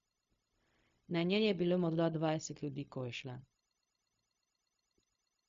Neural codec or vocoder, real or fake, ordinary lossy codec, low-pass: codec, 16 kHz, 0.4 kbps, LongCat-Audio-Codec; fake; MP3, 48 kbps; 7.2 kHz